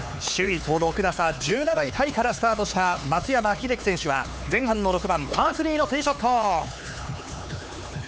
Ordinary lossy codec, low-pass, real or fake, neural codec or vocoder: none; none; fake; codec, 16 kHz, 4 kbps, X-Codec, HuBERT features, trained on LibriSpeech